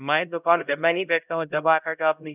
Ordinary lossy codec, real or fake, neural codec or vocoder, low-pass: none; fake; codec, 16 kHz, 0.5 kbps, X-Codec, HuBERT features, trained on LibriSpeech; 3.6 kHz